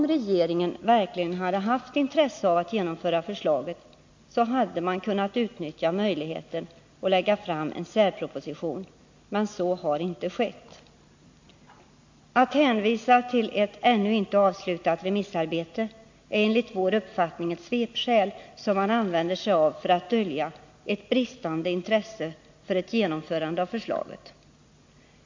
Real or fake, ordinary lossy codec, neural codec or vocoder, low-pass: real; MP3, 48 kbps; none; 7.2 kHz